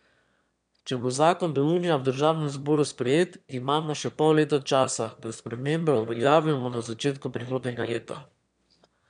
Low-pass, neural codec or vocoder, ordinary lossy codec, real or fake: 9.9 kHz; autoencoder, 22.05 kHz, a latent of 192 numbers a frame, VITS, trained on one speaker; none; fake